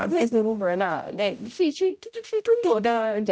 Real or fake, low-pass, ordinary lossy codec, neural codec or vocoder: fake; none; none; codec, 16 kHz, 0.5 kbps, X-Codec, HuBERT features, trained on general audio